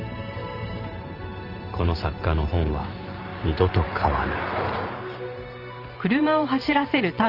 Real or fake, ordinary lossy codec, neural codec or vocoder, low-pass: fake; Opus, 24 kbps; vocoder, 44.1 kHz, 128 mel bands every 512 samples, BigVGAN v2; 5.4 kHz